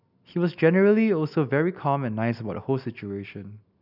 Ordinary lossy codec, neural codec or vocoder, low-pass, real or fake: none; none; 5.4 kHz; real